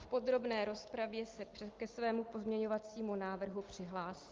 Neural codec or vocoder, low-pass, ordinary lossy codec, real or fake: none; 7.2 kHz; Opus, 32 kbps; real